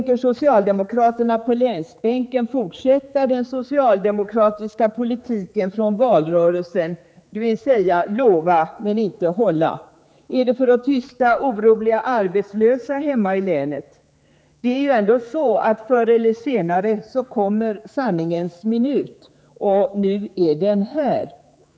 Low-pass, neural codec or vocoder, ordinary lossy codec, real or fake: none; codec, 16 kHz, 4 kbps, X-Codec, HuBERT features, trained on general audio; none; fake